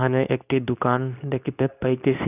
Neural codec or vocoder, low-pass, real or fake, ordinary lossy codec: codec, 24 kHz, 0.9 kbps, WavTokenizer, medium speech release version 2; 3.6 kHz; fake; none